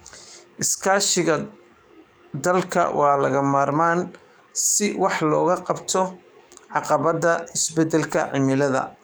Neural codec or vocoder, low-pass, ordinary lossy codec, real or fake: codec, 44.1 kHz, 7.8 kbps, DAC; none; none; fake